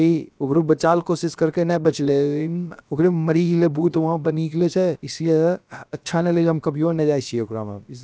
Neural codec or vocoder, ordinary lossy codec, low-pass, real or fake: codec, 16 kHz, about 1 kbps, DyCAST, with the encoder's durations; none; none; fake